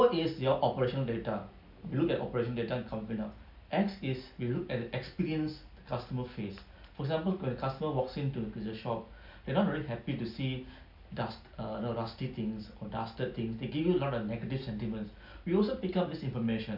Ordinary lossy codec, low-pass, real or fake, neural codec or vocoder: none; 5.4 kHz; real; none